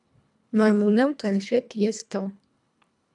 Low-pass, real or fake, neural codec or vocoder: 10.8 kHz; fake; codec, 24 kHz, 1.5 kbps, HILCodec